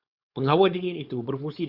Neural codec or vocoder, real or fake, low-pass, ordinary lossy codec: codec, 16 kHz, 4.8 kbps, FACodec; fake; 5.4 kHz; Opus, 64 kbps